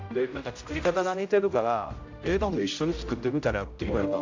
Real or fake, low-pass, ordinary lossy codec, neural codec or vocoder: fake; 7.2 kHz; AAC, 48 kbps; codec, 16 kHz, 0.5 kbps, X-Codec, HuBERT features, trained on general audio